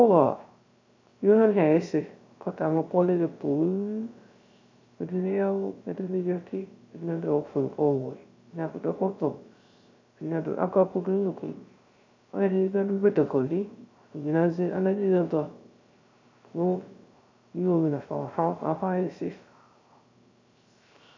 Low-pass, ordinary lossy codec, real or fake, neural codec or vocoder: 7.2 kHz; MP3, 64 kbps; fake; codec, 16 kHz, 0.3 kbps, FocalCodec